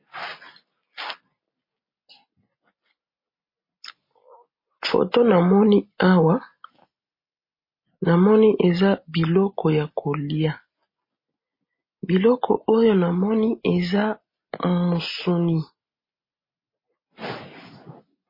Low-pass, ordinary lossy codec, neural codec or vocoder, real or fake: 5.4 kHz; MP3, 24 kbps; none; real